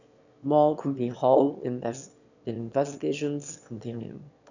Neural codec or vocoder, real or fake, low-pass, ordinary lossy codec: autoencoder, 22.05 kHz, a latent of 192 numbers a frame, VITS, trained on one speaker; fake; 7.2 kHz; none